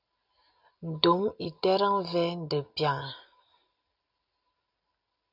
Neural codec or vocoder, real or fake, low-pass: none; real; 5.4 kHz